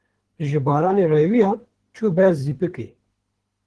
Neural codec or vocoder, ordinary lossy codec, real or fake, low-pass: codec, 24 kHz, 3 kbps, HILCodec; Opus, 16 kbps; fake; 10.8 kHz